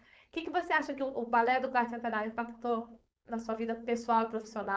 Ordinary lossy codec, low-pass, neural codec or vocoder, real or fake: none; none; codec, 16 kHz, 4.8 kbps, FACodec; fake